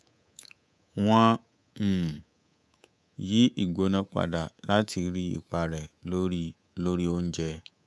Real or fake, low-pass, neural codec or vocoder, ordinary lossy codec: fake; none; codec, 24 kHz, 3.1 kbps, DualCodec; none